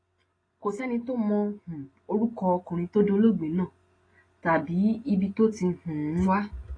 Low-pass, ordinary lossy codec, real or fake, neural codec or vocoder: 9.9 kHz; AAC, 32 kbps; real; none